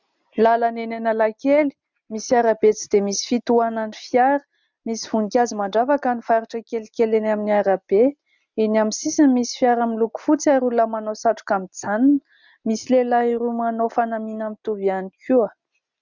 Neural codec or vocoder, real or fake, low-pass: none; real; 7.2 kHz